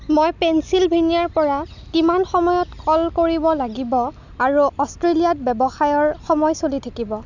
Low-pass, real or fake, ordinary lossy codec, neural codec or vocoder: 7.2 kHz; real; none; none